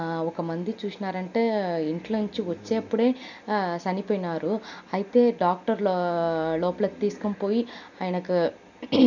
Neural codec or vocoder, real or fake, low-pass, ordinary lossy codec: none; real; 7.2 kHz; none